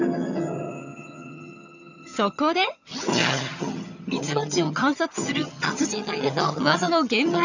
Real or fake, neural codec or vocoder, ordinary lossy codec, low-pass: fake; vocoder, 22.05 kHz, 80 mel bands, HiFi-GAN; none; 7.2 kHz